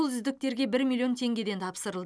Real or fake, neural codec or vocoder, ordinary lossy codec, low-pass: real; none; none; none